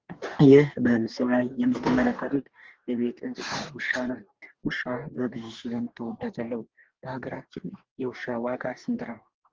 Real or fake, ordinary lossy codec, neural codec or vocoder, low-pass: fake; Opus, 16 kbps; codec, 44.1 kHz, 2.6 kbps, DAC; 7.2 kHz